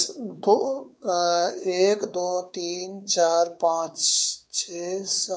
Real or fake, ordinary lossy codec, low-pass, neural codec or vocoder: fake; none; none; codec, 16 kHz, 4 kbps, X-Codec, WavLM features, trained on Multilingual LibriSpeech